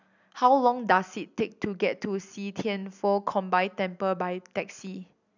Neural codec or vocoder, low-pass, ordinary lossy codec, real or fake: none; 7.2 kHz; none; real